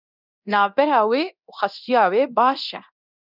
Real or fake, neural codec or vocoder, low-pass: fake; codec, 24 kHz, 0.9 kbps, DualCodec; 5.4 kHz